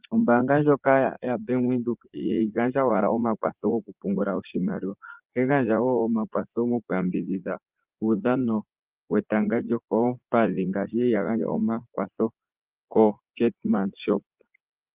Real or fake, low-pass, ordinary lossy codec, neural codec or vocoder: fake; 3.6 kHz; Opus, 32 kbps; vocoder, 44.1 kHz, 80 mel bands, Vocos